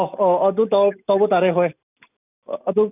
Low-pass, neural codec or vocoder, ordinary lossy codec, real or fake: 3.6 kHz; none; none; real